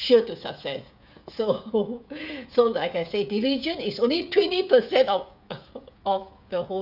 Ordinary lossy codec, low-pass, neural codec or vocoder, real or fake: none; 5.4 kHz; codec, 16 kHz, 16 kbps, FreqCodec, smaller model; fake